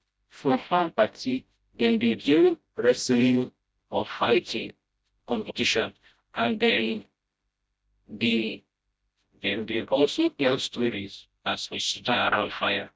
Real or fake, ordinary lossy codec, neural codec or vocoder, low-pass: fake; none; codec, 16 kHz, 0.5 kbps, FreqCodec, smaller model; none